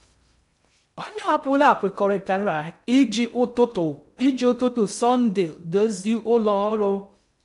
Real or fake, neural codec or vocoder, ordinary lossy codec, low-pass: fake; codec, 16 kHz in and 24 kHz out, 0.6 kbps, FocalCodec, streaming, 2048 codes; none; 10.8 kHz